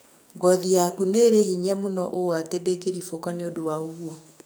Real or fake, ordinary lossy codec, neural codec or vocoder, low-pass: fake; none; codec, 44.1 kHz, 2.6 kbps, SNAC; none